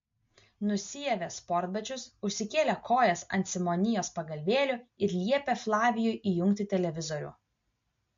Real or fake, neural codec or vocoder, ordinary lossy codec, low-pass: real; none; MP3, 48 kbps; 7.2 kHz